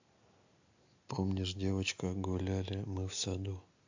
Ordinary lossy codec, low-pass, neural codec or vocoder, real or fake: AAC, 48 kbps; 7.2 kHz; none; real